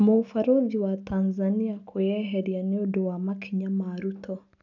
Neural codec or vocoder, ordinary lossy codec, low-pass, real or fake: none; none; 7.2 kHz; real